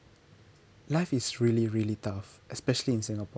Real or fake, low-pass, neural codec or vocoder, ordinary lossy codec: real; none; none; none